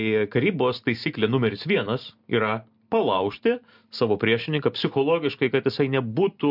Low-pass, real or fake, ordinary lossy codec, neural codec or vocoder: 5.4 kHz; real; MP3, 48 kbps; none